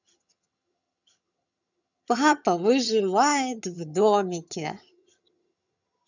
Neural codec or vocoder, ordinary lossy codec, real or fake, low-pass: vocoder, 22.05 kHz, 80 mel bands, HiFi-GAN; none; fake; 7.2 kHz